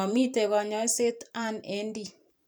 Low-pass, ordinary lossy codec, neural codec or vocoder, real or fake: none; none; vocoder, 44.1 kHz, 128 mel bands every 512 samples, BigVGAN v2; fake